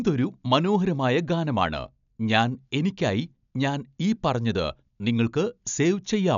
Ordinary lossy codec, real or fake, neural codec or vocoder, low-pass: none; real; none; 7.2 kHz